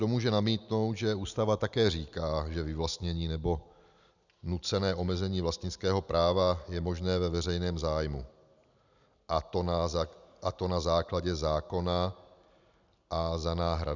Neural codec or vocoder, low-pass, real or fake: none; 7.2 kHz; real